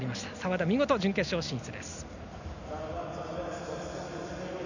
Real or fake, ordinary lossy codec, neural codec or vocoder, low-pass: real; none; none; 7.2 kHz